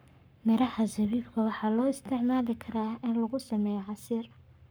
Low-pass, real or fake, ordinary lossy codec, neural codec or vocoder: none; fake; none; codec, 44.1 kHz, 7.8 kbps, Pupu-Codec